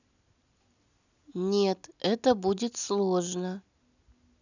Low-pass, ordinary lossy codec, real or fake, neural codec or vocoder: 7.2 kHz; none; real; none